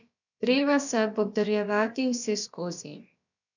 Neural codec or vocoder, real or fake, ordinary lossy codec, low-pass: codec, 16 kHz, about 1 kbps, DyCAST, with the encoder's durations; fake; none; 7.2 kHz